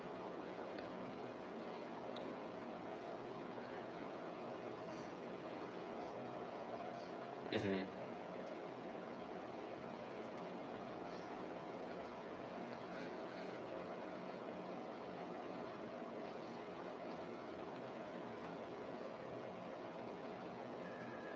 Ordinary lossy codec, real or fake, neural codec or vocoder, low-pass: none; fake; codec, 16 kHz, 8 kbps, FreqCodec, smaller model; none